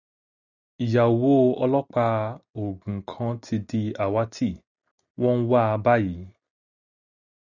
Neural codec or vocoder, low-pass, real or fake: none; 7.2 kHz; real